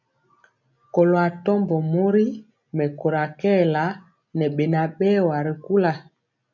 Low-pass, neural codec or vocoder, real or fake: 7.2 kHz; none; real